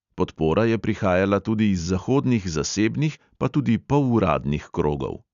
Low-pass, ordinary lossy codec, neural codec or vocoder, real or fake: 7.2 kHz; none; none; real